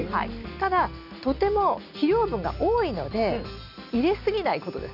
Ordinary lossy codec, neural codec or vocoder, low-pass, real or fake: none; none; 5.4 kHz; real